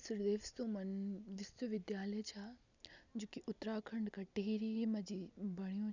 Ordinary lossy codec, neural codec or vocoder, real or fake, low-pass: none; none; real; 7.2 kHz